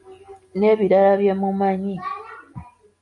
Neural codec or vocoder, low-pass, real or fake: none; 10.8 kHz; real